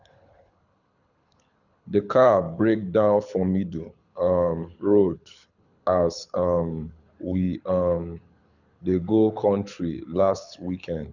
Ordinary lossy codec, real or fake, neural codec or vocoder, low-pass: none; fake; codec, 24 kHz, 6 kbps, HILCodec; 7.2 kHz